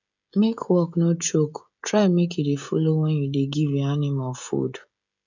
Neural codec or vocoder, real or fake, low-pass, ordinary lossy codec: codec, 16 kHz, 16 kbps, FreqCodec, smaller model; fake; 7.2 kHz; none